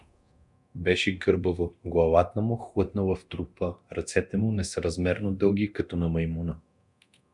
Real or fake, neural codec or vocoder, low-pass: fake; codec, 24 kHz, 0.9 kbps, DualCodec; 10.8 kHz